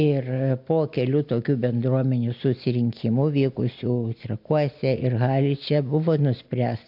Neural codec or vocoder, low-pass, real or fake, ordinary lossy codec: none; 5.4 kHz; real; MP3, 48 kbps